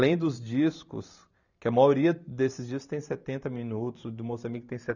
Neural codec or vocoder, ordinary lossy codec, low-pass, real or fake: vocoder, 44.1 kHz, 128 mel bands every 512 samples, BigVGAN v2; none; 7.2 kHz; fake